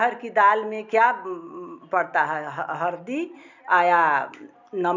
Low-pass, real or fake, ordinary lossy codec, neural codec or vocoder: 7.2 kHz; real; none; none